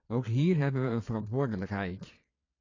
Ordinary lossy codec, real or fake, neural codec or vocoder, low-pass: AAC, 32 kbps; fake; vocoder, 22.05 kHz, 80 mel bands, Vocos; 7.2 kHz